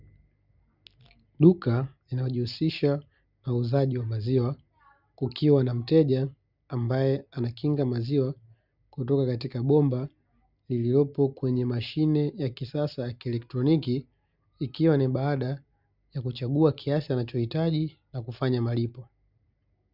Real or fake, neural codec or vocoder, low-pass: real; none; 5.4 kHz